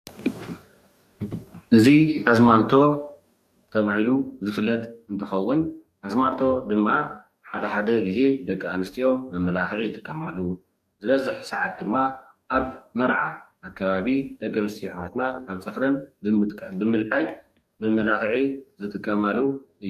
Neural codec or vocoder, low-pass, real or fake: codec, 44.1 kHz, 2.6 kbps, DAC; 14.4 kHz; fake